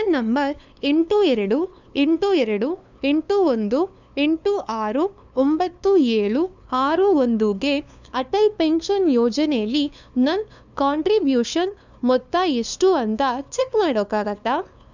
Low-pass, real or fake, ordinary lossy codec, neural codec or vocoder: 7.2 kHz; fake; none; codec, 16 kHz, 2 kbps, FunCodec, trained on LibriTTS, 25 frames a second